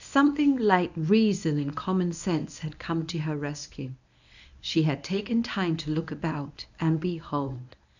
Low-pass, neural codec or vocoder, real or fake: 7.2 kHz; codec, 24 kHz, 0.9 kbps, WavTokenizer, medium speech release version 1; fake